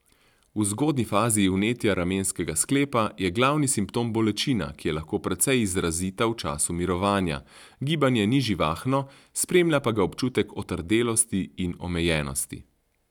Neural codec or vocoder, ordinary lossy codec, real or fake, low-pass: none; none; real; 19.8 kHz